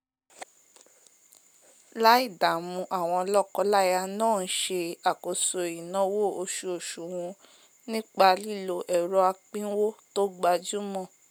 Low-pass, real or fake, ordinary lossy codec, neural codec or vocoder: none; real; none; none